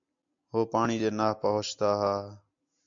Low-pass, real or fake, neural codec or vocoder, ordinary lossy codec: 7.2 kHz; real; none; MP3, 64 kbps